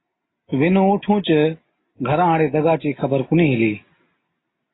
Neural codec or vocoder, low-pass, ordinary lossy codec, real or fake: none; 7.2 kHz; AAC, 16 kbps; real